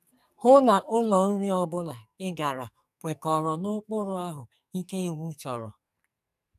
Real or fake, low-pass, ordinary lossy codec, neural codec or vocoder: fake; 14.4 kHz; none; codec, 44.1 kHz, 2.6 kbps, SNAC